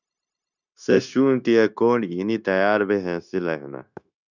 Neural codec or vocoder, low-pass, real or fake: codec, 16 kHz, 0.9 kbps, LongCat-Audio-Codec; 7.2 kHz; fake